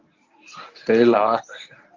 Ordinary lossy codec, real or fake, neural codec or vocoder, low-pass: Opus, 24 kbps; fake; codec, 24 kHz, 0.9 kbps, WavTokenizer, medium speech release version 1; 7.2 kHz